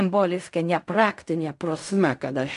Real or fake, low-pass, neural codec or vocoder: fake; 10.8 kHz; codec, 16 kHz in and 24 kHz out, 0.4 kbps, LongCat-Audio-Codec, fine tuned four codebook decoder